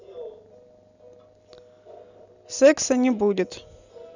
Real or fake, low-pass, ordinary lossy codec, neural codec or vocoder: real; 7.2 kHz; none; none